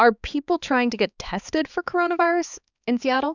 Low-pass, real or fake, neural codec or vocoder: 7.2 kHz; fake; codec, 16 kHz, 4 kbps, X-Codec, HuBERT features, trained on balanced general audio